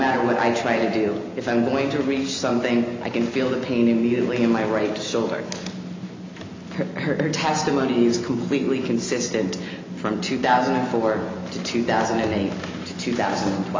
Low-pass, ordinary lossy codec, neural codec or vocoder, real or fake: 7.2 kHz; MP3, 64 kbps; none; real